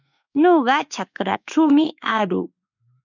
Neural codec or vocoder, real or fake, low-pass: autoencoder, 48 kHz, 32 numbers a frame, DAC-VAE, trained on Japanese speech; fake; 7.2 kHz